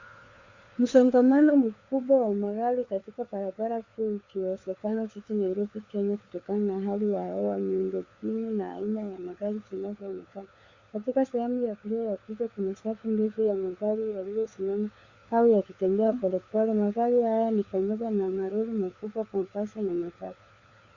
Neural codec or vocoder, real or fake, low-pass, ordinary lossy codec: codec, 16 kHz, 4 kbps, FunCodec, trained on LibriTTS, 50 frames a second; fake; 7.2 kHz; Opus, 64 kbps